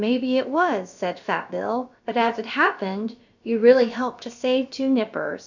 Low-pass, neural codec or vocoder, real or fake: 7.2 kHz; codec, 16 kHz, about 1 kbps, DyCAST, with the encoder's durations; fake